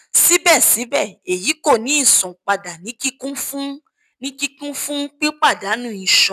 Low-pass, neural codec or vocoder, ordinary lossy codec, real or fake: 14.4 kHz; none; none; real